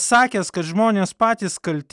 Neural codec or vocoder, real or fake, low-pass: none; real; 10.8 kHz